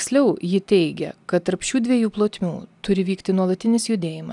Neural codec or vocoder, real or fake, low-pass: none; real; 10.8 kHz